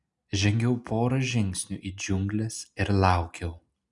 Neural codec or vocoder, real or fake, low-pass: none; real; 10.8 kHz